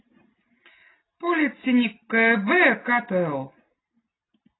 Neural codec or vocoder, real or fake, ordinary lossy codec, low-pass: none; real; AAC, 16 kbps; 7.2 kHz